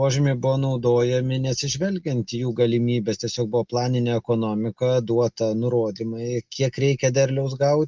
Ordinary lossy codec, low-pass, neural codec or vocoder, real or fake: Opus, 24 kbps; 7.2 kHz; none; real